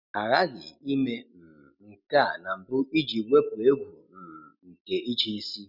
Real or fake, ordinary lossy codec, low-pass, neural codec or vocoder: real; none; 5.4 kHz; none